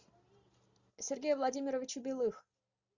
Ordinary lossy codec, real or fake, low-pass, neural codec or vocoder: Opus, 64 kbps; real; 7.2 kHz; none